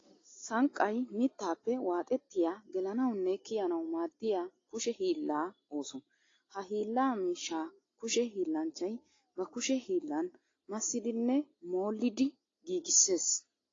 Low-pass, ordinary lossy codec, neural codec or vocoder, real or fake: 7.2 kHz; AAC, 32 kbps; none; real